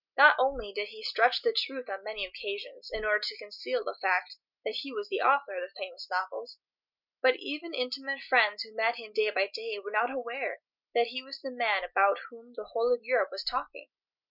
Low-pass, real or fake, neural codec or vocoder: 5.4 kHz; real; none